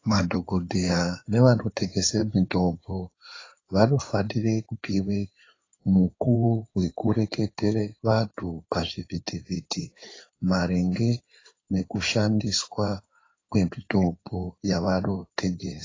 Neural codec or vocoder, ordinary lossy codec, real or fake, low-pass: codec, 16 kHz in and 24 kHz out, 2.2 kbps, FireRedTTS-2 codec; AAC, 32 kbps; fake; 7.2 kHz